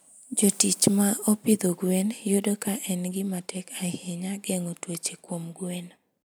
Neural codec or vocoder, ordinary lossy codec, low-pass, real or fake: none; none; none; real